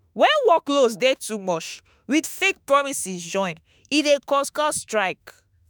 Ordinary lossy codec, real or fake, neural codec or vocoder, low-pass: none; fake; autoencoder, 48 kHz, 32 numbers a frame, DAC-VAE, trained on Japanese speech; none